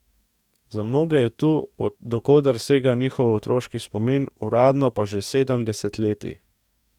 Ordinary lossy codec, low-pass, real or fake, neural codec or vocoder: none; 19.8 kHz; fake; codec, 44.1 kHz, 2.6 kbps, DAC